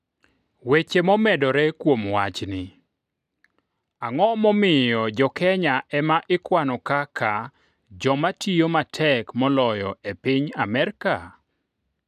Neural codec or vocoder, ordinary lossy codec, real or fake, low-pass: none; none; real; 14.4 kHz